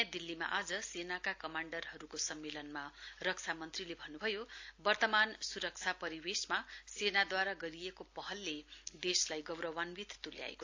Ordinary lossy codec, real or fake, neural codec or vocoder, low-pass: AAC, 48 kbps; real; none; 7.2 kHz